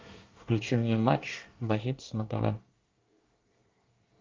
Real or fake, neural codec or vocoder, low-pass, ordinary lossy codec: fake; codec, 24 kHz, 1 kbps, SNAC; 7.2 kHz; Opus, 24 kbps